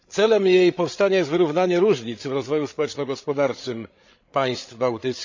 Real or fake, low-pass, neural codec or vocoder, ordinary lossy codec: fake; 7.2 kHz; codec, 16 kHz, 8 kbps, FreqCodec, larger model; MP3, 64 kbps